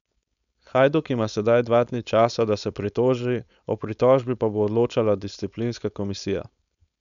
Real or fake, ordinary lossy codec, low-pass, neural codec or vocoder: fake; none; 7.2 kHz; codec, 16 kHz, 4.8 kbps, FACodec